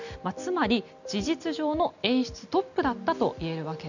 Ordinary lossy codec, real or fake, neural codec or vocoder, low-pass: none; real; none; 7.2 kHz